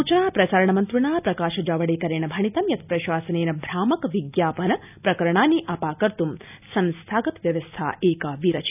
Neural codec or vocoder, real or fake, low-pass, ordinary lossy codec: none; real; 3.6 kHz; none